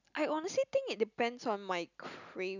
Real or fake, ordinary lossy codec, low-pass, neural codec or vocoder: real; none; 7.2 kHz; none